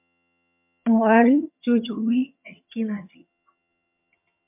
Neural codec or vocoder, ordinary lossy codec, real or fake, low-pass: vocoder, 22.05 kHz, 80 mel bands, HiFi-GAN; MP3, 32 kbps; fake; 3.6 kHz